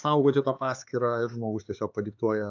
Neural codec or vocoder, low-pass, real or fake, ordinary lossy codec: codec, 16 kHz, 4 kbps, X-Codec, HuBERT features, trained on LibriSpeech; 7.2 kHz; fake; AAC, 48 kbps